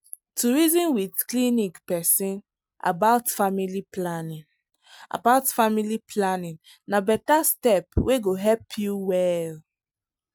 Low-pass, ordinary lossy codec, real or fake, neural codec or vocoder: none; none; real; none